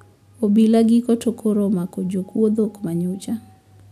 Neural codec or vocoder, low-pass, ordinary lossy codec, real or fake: none; 14.4 kHz; none; real